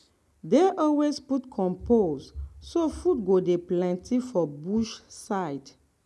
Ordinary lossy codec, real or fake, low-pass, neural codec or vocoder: none; real; none; none